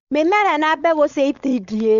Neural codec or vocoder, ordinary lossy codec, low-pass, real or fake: codec, 16 kHz, 4.8 kbps, FACodec; none; 7.2 kHz; fake